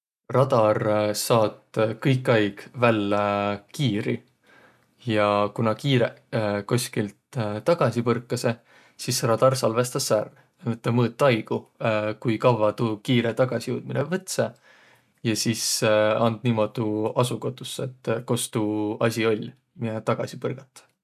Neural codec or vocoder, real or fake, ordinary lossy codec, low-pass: none; real; none; 14.4 kHz